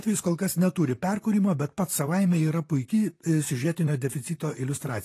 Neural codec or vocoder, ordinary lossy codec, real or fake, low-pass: vocoder, 44.1 kHz, 128 mel bands, Pupu-Vocoder; AAC, 48 kbps; fake; 14.4 kHz